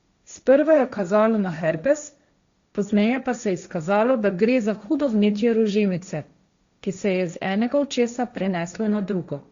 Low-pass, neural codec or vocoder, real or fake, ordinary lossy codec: 7.2 kHz; codec, 16 kHz, 1.1 kbps, Voila-Tokenizer; fake; Opus, 64 kbps